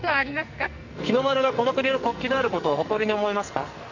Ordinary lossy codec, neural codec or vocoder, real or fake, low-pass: none; codec, 44.1 kHz, 2.6 kbps, SNAC; fake; 7.2 kHz